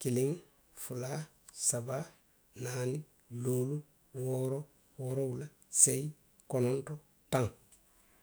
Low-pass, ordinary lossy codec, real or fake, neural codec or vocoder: none; none; fake; autoencoder, 48 kHz, 128 numbers a frame, DAC-VAE, trained on Japanese speech